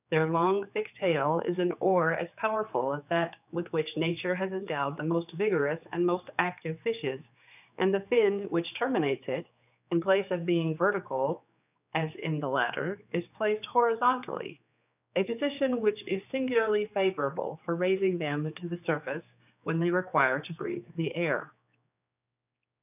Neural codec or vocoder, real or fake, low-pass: codec, 16 kHz, 4 kbps, X-Codec, HuBERT features, trained on general audio; fake; 3.6 kHz